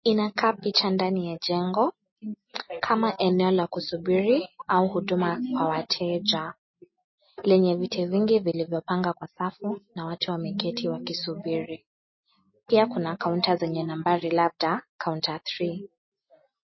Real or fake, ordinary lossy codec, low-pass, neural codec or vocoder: real; MP3, 24 kbps; 7.2 kHz; none